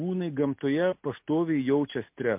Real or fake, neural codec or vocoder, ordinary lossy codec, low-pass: real; none; MP3, 32 kbps; 3.6 kHz